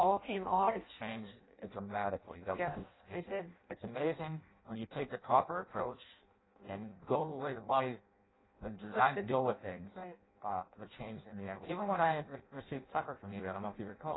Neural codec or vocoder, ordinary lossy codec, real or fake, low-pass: codec, 16 kHz in and 24 kHz out, 0.6 kbps, FireRedTTS-2 codec; AAC, 16 kbps; fake; 7.2 kHz